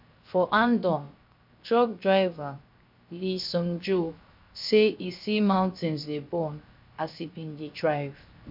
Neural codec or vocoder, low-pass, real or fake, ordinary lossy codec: codec, 16 kHz, 0.7 kbps, FocalCodec; 5.4 kHz; fake; MP3, 48 kbps